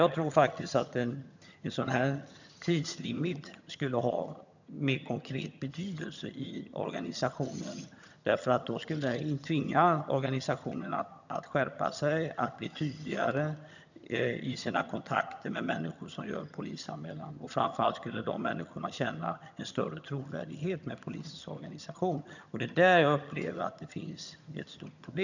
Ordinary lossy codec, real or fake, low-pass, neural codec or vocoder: none; fake; 7.2 kHz; vocoder, 22.05 kHz, 80 mel bands, HiFi-GAN